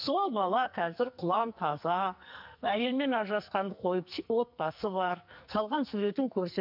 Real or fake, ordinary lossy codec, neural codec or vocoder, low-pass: fake; none; codec, 44.1 kHz, 2.6 kbps, SNAC; 5.4 kHz